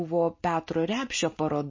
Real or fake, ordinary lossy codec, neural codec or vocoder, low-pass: real; MP3, 32 kbps; none; 7.2 kHz